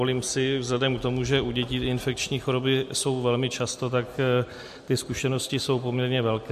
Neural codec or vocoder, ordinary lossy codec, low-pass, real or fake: none; MP3, 64 kbps; 14.4 kHz; real